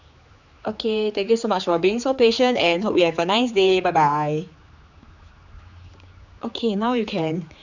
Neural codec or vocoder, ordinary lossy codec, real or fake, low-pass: codec, 16 kHz, 4 kbps, X-Codec, HuBERT features, trained on general audio; none; fake; 7.2 kHz